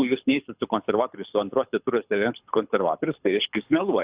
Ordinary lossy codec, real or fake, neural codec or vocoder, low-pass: Opus, 64 kbps; fake; codec, 24 kHz, 6 kbps, HILCodec; 3.6 kHz